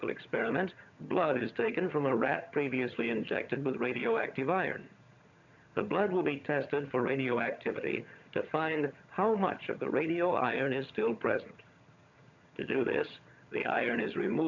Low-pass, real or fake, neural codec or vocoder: 7.2 kHz; fake; vocoder, 22.05 kHz, 80 mel bands, HiFi-GAN